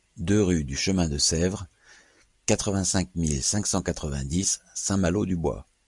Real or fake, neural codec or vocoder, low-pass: real; none; 10.8 kHz